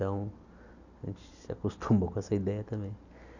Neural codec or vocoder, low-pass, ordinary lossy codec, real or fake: none; 7.2 kHz; none; real